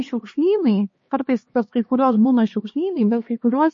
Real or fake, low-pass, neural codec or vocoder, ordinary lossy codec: fake; 7.2 kHz; codec, 16 kHz, 2 kbps, X-Codec, HuBERT features, trained on LibriSpeech; MP3, 32 kbps